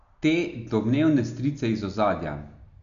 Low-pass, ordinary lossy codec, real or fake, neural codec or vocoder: 7.2 kHz; none; real; none